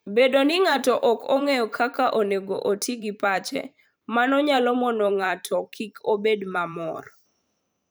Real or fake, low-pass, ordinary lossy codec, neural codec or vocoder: fake; none; none; vocoder, 44.1 kHz, 128 mel bands every 512 samples, BigVGAN v2